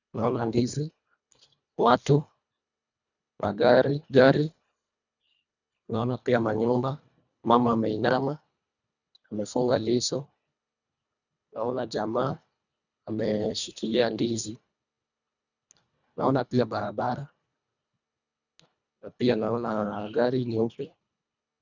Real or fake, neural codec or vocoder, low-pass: fake; codec, 24 kHz, 1.5 kbps, HILCodec; 7.2 kHz